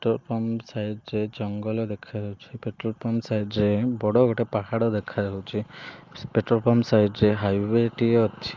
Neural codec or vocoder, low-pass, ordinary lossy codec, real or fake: none; 7.2 kHz; Opus, 32 kbps; real